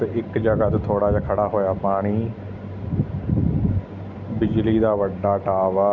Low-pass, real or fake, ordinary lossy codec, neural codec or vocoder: 7.2 kHz; real; none; none